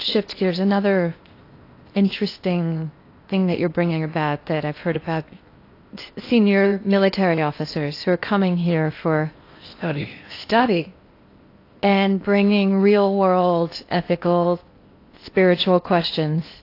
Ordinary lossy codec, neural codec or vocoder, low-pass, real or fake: AAC, 32 kbps; codec, 16 kHz in and 24 kHz out, 0.8 kbps, FocalCodec, streaming, 65536 codes; 5.4 kHz; fake